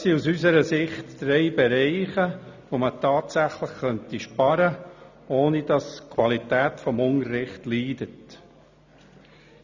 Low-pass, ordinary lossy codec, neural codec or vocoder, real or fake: 7.2 kHz; none; none; real